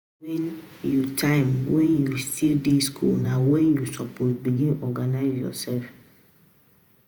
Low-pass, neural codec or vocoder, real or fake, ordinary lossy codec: none; vocoder, 48 kHz, 128 mel bands, Vocos; fake; none